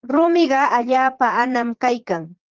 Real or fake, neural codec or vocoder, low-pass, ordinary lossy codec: fake; vocoder, 22.05 kHz, 80 mel bands, Vocos; 7.2 kHz; Opus, 16 kbps